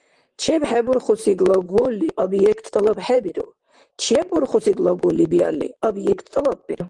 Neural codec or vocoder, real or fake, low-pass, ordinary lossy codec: vocoder, 44.1 kHz, 128 mel bands, Pupu-Vocoder; fake; 10.8 kHz; Opus, 24 kbps